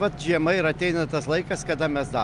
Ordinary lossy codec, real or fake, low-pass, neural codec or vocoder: Opus, 32 kbps; real; 10.8 kHz; none